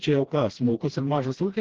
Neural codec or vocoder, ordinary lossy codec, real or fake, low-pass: codec, 16 kHz, 1 kbps, FreqCodec, smaller model; Opus, 16 kbps; fake; 7.2 kHz